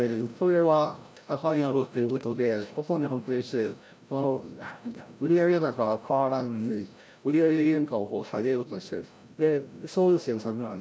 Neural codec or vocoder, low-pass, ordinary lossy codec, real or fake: codec, 16 kHz, 0.5 kbps, FreqCodec, larger model; none; none; fake